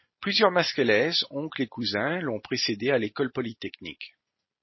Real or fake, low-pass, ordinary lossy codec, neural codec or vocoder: real; 7.2 kHz; MP3, 24 kbps; none